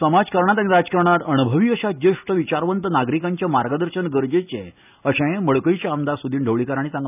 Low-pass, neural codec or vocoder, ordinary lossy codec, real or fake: 3.6 kHz; none; none; real